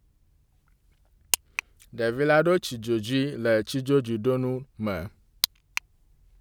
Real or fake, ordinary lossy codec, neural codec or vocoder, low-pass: real; none; none; none